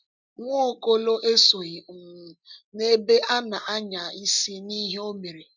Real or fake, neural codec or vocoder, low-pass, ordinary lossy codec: real; none; 7.2 kHz; none